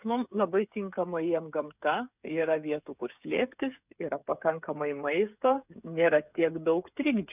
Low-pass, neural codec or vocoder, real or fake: 3.6 kHz; codec, 16 kHz, 8 kbps, FreqCodec, smaller model; fake